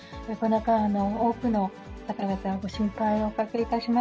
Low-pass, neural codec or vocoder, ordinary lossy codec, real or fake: none; none; none; real